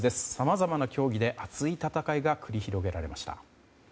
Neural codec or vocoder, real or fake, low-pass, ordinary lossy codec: none; real; none; none